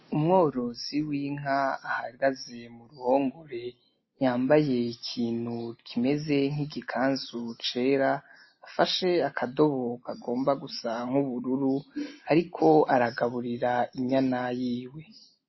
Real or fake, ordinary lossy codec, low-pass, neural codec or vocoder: real; MP3, 24 kbps; 7.2 kHz; none